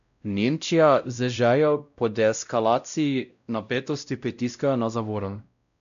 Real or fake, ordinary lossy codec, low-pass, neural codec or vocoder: fake; none; 7.2 kHz; codec, 16 kHz, 0.5 kbps, X-Codec, WavLM features, trained on Multilingual LibriSpeech